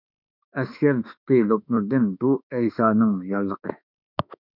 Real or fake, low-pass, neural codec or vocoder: fake; 5.4 kHz; autoencoder, 48 kHz, 32 numbers a frame, DAC-VAE, trained on Japanese speech